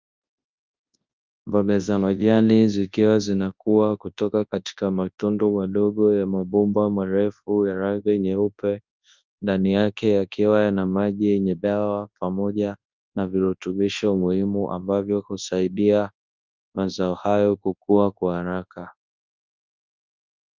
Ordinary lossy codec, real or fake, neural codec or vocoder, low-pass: Opus, 24 kbps; fake; codec, 24 kHz, 0.9 kbps, WavTokenizer, large speech release; 7.2 kHz